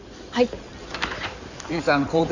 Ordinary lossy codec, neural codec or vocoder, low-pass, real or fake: none; codec, 16 kHz in and 24 kHz out, 2.2 kbps, FireRedTTS-2 codec; 7.2 kHz; fake